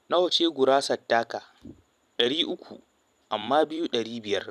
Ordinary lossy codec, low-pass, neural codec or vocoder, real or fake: none; 14.4 kHz; vocoder, 44.1 kHz, 128 mel bands every 256 samples, BigVGAN v2; fake